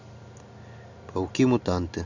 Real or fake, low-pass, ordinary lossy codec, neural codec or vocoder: real; 7.2 kHz; none; none